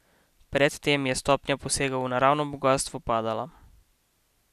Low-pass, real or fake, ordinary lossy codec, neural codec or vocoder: 14.4 kHz; real; none; none